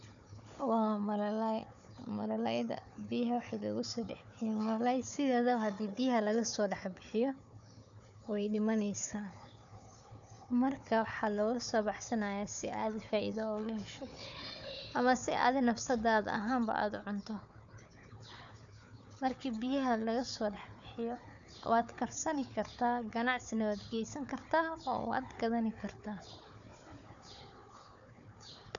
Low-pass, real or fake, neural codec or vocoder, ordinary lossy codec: 7.2 kHz; fake; codec, 16 kHz, 4 kbps, FunCodec, trained on Chinese and English, 50 frames a second; none